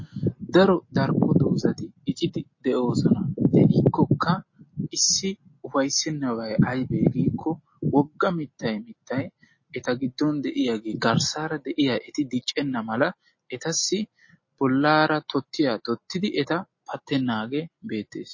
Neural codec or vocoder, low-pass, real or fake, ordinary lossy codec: none; 7.2 kHz; real; MP3, 32 kbps